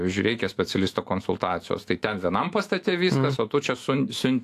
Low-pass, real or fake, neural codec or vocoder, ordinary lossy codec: 14.4 kHz; fake; autoencoder, 48 kHz, 128 numbers a frame, DAC-VAE, trained on Japanese speech; AAC, 64 kbps